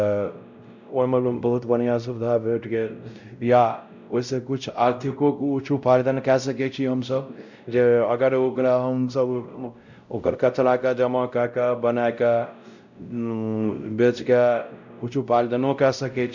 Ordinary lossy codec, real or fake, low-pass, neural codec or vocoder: none; fake; 7.2 kHz; codec, 16 kHz, 0.5 kbps, X-Codec, WavLM features, trained on Multilingual LibriSpeech